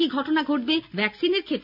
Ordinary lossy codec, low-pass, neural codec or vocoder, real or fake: none; 5.4 kHz; none; real